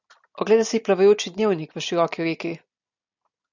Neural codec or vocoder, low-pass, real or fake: none; 7.2 kHz; real